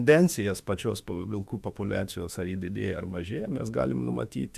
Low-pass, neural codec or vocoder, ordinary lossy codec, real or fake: 14.4 kHz; autoencoder, 48 kHz, 32 numbers a frame, DAC-VAE, trained on Japanese speech; AAC, 96 kbps; fake